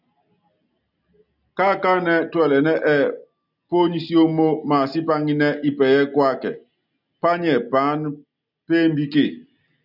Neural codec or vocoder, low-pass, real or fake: none; 5.4 kHz; real